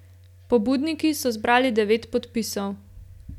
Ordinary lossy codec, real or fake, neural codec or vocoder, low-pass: none; real; none; 19.8 kHz